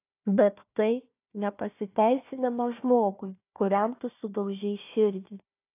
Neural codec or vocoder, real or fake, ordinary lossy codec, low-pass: codec, 16 kHz, 1 kbps, FunCodec, trained on Chinese and English, 50 frames a second; fake; AAC, 24 kbps; 3.6 kHz